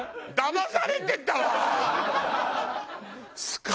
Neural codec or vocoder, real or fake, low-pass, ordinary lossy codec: none; real; none; none